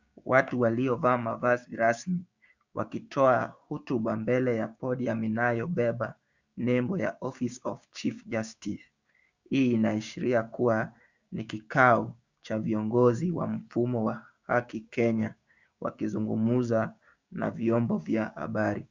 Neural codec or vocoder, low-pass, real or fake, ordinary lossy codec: codec, 16 kHz, 6 kbps, DAC; 7.2 kHz; fake; Opus, 64 kbps